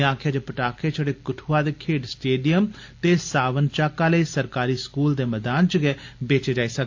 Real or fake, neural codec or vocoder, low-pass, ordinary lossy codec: real; none; 7.2 kHz; AAC, 48 kbps